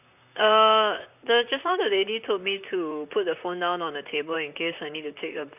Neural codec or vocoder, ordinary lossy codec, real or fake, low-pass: vocoder, 44.1 kHz, 128 mel bands, Pupu-Vocoder; none; fake; 3.6 kHz